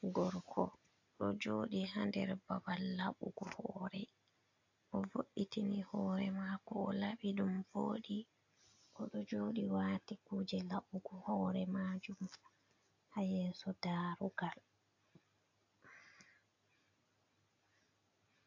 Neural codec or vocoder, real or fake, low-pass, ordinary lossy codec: none; real; 7.2 kHz; AAC, 48 kbps